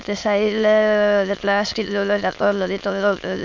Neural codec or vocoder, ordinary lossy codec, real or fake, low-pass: autoencoder, 22.05 kHz, a latent of 192 numbers a frame, VITS, trained on many speakers; MP3, 64 kbps; fake; 7.2 kHz